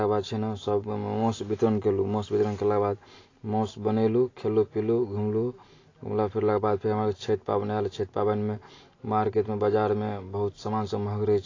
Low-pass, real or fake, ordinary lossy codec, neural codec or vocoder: 7.2 kHz; real; AAC, 32 kbps; none